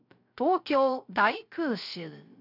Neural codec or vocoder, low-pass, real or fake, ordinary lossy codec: codec, 16 kHz, 0.3 kbps, FocalCodec; 5.4 kHz; fake; none